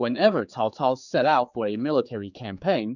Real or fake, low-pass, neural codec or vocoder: fake; 7.2 kHz; codec, 16 kHz, 4 kbps, X-Codec, HuBERT features, trained on general audio